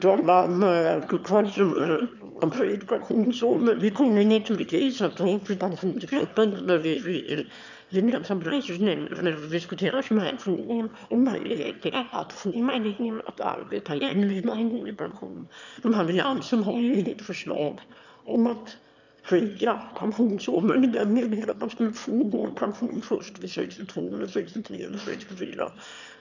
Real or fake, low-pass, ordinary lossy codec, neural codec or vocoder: fake; 7.2 kHz; none; autoencoder, 22.05 kHz, a latent of 192 numbers a frame, VITS, trained on one speaker